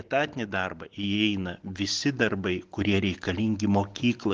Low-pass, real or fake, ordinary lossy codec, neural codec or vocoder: 7.2 kHz; real; Opus, 16 kbps; none